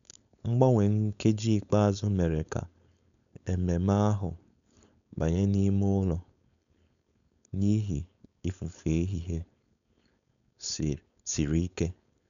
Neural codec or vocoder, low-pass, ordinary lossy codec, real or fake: codec, 16 kHz, 4.8 kbps, FACodec; 7.2 kHz; none; fake